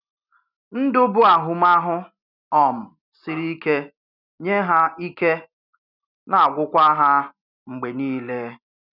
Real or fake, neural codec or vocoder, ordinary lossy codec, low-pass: real; none; none; 5.4 kHz